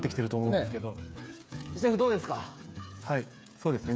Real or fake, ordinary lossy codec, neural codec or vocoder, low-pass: fake; none; codec, 16 kHz, 8 kbps, FreqCodec, smaller model; none